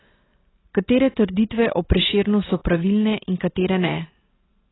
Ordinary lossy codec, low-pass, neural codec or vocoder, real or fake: AAC, 16 kbps; 7.2 kHz; none; real